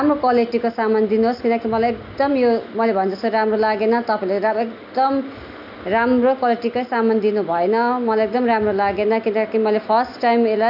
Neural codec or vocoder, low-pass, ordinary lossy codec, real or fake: none; 5.4 kHz; AAC, 48 kbps; real